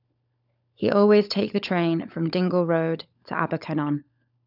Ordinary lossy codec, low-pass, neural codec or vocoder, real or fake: none; 5.4 kHz; codec, 16 kHz, 16 kbps, FunCodec, trained on LibriTTS, 50 frames a second; fake